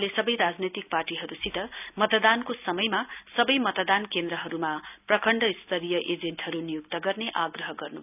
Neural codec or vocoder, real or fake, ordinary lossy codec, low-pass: none; real; none; 3.6 kHz